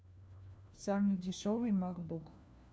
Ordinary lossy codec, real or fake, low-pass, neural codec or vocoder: none; fake; none; codec, 16 kHz, 1 kbps, FunCodec, trained on LibriTTS, 50 frames a second